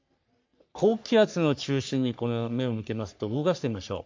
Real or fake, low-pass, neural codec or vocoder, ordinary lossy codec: fake; 7.2 kHz; codec, 44.1 kHz, 3.4 kbps, Pupu-Codec; MP3, 48 kbps